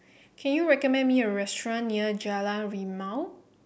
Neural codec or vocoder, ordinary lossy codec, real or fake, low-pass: none; none; real; none